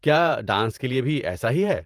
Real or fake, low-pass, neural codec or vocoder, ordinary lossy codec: fake; 14.4 kHz; vocoder, 48 kHz, 128 mel bands, Vocos; Opus, 32 kbps